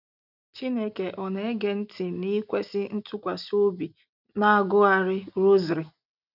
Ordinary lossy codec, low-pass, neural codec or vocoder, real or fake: none; 5.4 kHz; none; real